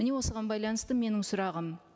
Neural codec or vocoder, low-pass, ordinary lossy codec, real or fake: none; none; none; real